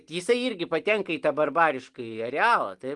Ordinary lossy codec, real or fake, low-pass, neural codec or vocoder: Opus, 24 kbps; real; 10.8 kHz; none